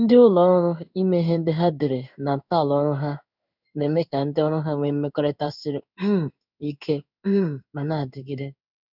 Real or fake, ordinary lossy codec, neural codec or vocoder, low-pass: fake; none; codec, 16 kHz in and 24 kHz out, 1 kbps, XY-Tokenizer; 5.4 kHz